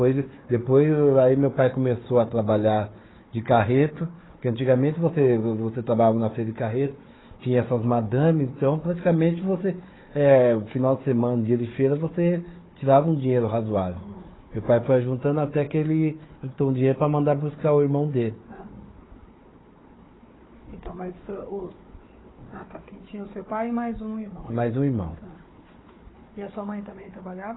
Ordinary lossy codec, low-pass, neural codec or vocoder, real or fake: AAC, 16 kbps; 7.2 kHz; codec, 16 kHz, 4 kbps, FunCodec, trained on Chinese and English, 50 frames a second; fake